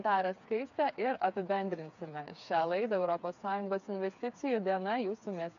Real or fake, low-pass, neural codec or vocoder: fake; 7.2 kHz; codec, 16 kHz, 4 kbps, FreqCodec, smaller model